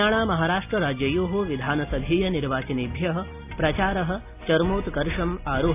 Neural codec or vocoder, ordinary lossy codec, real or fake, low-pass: none; AAC, 32 kbps; real; 3.6 kHz